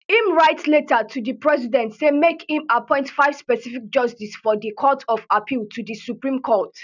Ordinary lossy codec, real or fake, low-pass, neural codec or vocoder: none; real; 7.2 kHz; none